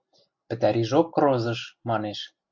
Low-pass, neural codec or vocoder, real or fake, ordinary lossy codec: 7.2 kHz; none; real; MP3, 64 kbps